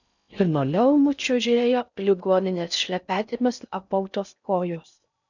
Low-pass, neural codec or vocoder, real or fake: 7.2 kHz; codec, 16 kHz in and 24 kHz out, 0.6 kbps, FocalCodec, streaming, 4096 codes; fake